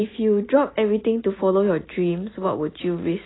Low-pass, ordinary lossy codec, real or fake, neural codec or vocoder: 7.2 kHz; AAC, 16 kbps; real; none